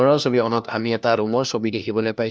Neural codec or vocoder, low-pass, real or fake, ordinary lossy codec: codec, 16 kHz, 1 kbps, FunCodec, trained on LibriTTS, 50 frames a second; none; fake; none